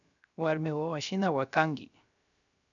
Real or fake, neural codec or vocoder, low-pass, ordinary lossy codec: fake; codec, 16 kHz, 0.3 kbps, FocalCodec; 7.2 kHz; AAC, 64 kbps